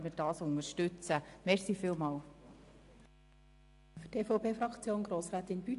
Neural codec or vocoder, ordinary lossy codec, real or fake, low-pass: none; AAC, 64 kbps; real; 10.8 kHz